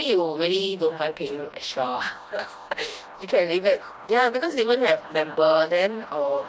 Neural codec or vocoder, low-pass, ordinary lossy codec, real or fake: codec, 16 kHz, 1 kbps, FreqCodec, smaller model; none; none; fake